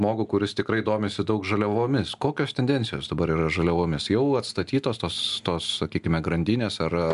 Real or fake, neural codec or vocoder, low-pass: real; none; 10.8 kHz